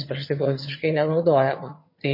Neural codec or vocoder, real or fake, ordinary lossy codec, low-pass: vocoder, 22.05 kHz, 80 mel bands, HiFi-GAN; fake; MP3, 24 kbps; 5.4 kHz